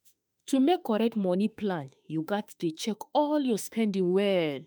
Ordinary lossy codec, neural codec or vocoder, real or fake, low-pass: none; autoencoder, 48 kHz, 32 numbers a frame, DAC-VAE, trained on Japanese speech; fake; none